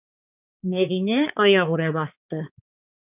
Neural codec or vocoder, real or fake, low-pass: codec, 16 kHz, 4 kbps, X-Codec, HuBERT features, trained on balanced general audio; fake; 3.6 kHz